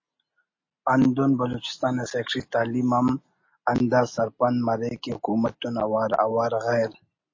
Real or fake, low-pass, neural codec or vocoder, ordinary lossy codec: real; 7.2 kHz; none; MP3, 32 kbps